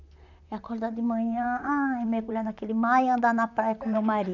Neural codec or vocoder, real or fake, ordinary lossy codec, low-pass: vocoder, 44.1 kHz, 128 mel bands, Pupu-Vocoder; fake; none; 7.2 kHz